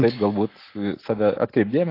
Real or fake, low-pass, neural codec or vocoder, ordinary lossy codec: real; 5.4 kHz; none; AAC, 32 kbps